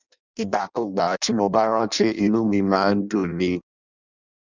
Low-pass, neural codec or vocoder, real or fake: 7.2 kHz; codec, 16 kHz in and 24 kHz out, 0.6 kbps, FireRedTTS-2 codec; fake